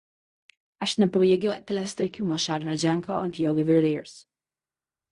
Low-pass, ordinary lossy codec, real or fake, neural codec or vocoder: 10.8 kHz; Opus, 64 kbps; fake; codec, 16 kHz in and 24 kHz out, 0.4 kbps, LongCat-Audio-Codec, fine tuned four codebook decoder